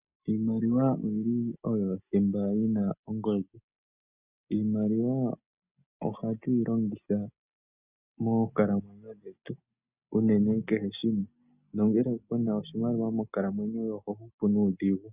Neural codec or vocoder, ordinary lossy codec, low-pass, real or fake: none; Opus, 64 kbps; 3.6 kHz; real